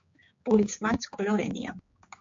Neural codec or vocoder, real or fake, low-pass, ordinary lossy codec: codec, 16 kHz, 4 kbps, X-Codec, HuBERT features, trained on general audio; fake; 7.2 kHz; MP3, 48 kbps